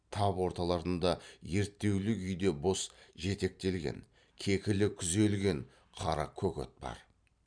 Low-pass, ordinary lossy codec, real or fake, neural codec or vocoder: 9.9 kHz; none; fake; vocoder, 44.1 kHz, 128 mel bands every 512 samples, BigVGAN v2